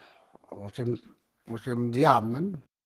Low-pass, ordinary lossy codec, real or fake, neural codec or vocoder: 14.4 kHz; Opus, 16 kbps; fake; codec, 32 kHz, 1.9 kbps, SNAC